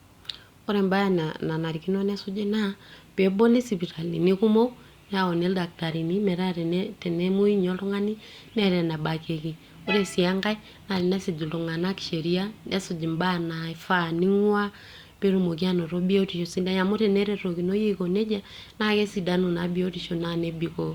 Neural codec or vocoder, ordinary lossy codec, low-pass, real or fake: none; Opus, 64 kbps; 19.8 kHz; real